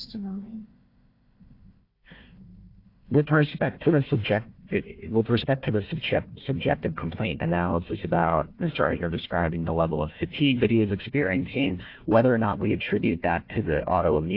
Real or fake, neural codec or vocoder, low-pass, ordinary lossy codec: fake; codec, 16 kHz, 1 kbps, FunCodec, trained on Chinese and English, 50 frames a second; 5.4 kHz; AAC, 32 kbps